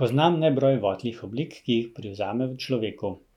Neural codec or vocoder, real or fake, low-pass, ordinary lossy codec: none; real; 19.8 kHz; none